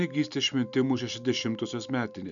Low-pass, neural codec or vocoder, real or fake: 7.2 kHz; none; real